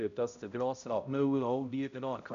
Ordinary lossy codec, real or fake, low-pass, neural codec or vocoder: none; fake; 7.2 kHz; codec, 16 kHz, 0.5 kbps, X-Codec, HuBERT features, trained on balanced general audio